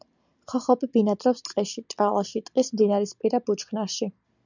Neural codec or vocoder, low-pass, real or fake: none; 7.2 kHz; real